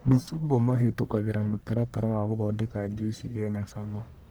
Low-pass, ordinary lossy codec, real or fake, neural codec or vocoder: none; none; fake; codec, 44.1 kHz, 1.7 kbps, Pupu-Codec